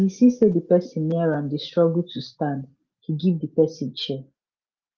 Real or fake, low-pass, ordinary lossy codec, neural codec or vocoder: real; 7.2 kHz; Opus, 32 kbps; none